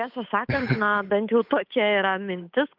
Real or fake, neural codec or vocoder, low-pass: fake; codec, 16 kHz, 8 kbps, FunCodec, trained on Chinese and English, 25 frames a second; 5.4 kHz